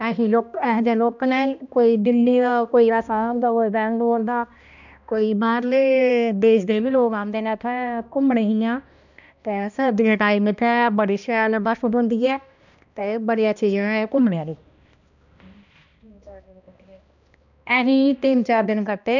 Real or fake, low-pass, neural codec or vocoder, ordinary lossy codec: fake; 7.2 kHz; codec, 16 kHz, 1 kbps, X-Codec, HuBERT features, trained on balanced general audio; none